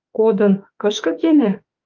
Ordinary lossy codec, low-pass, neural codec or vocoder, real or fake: Opus, 32 kbps; 7.2 kHz; autoencoder, 48 kHz, 32 numbers a frame, DAC-VAE, trained on Japanese speech; fake